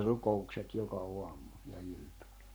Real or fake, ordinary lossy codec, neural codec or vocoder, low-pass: fake; none; codec, 44.1 kHz, 7.8 kbps, Pupu-Codec; none